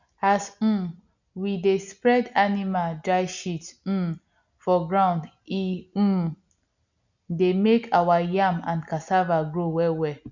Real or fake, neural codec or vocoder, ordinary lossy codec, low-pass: real; none; none; 7.2 kHz